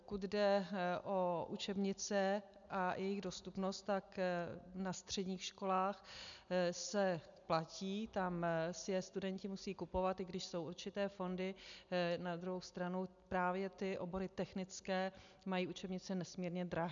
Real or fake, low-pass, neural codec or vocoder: real; 7.2 kHz; none